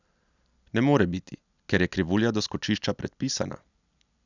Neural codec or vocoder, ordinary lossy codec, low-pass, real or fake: none; none; 7.2 kHz; real